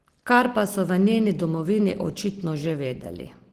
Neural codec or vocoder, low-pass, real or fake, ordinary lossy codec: none; 14.4 kHz; real; Opus, 16 kbps